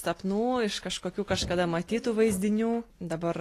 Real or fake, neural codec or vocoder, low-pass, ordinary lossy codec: real; none; 14.4 kHz; AAC, 48 kbps